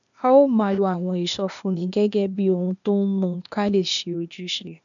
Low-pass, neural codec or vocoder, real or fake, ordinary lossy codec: 7.2 kHz; codec, 16 kHz, 0.8 kbps, ZipCodec; fake; none